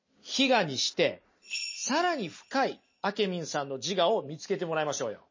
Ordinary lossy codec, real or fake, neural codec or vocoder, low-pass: MP3, 32 kbps; real; none; 7.2 kHz